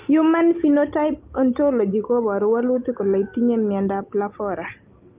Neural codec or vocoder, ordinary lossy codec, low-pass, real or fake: none; Opus, 32 kbps; 3.6 kHz; real